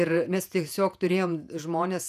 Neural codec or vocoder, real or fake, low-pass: vocoder, 48 kHz, 128 mel bands, Vocos; fake; 14.4 kHz